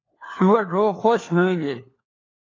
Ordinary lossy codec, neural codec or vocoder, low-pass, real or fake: AAC, 32 kbps; codec, 16 kHz, 4 kbps, FunCodec, trained on LibriTTS, 50 frames a second; 7.2 kHz; fake